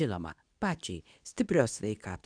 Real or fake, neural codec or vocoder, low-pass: fake; codec, 24 kHz, 0.9 kbps, WavTokenizer, medium speech release version 2; 9.9 kHz